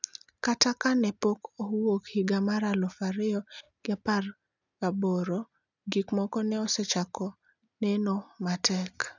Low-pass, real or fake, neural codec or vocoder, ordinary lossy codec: 7.2 kHz; real; none; none